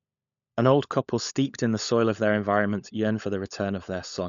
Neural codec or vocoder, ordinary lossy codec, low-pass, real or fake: codec, 16 kHz, 16 kbps, FunCodec, trained on LibriTTS, 50 frames a second; none; 7.2 kHz; fake